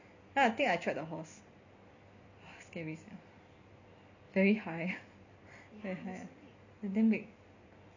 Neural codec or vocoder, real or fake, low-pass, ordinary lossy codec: none; real; 7.2 kHz; none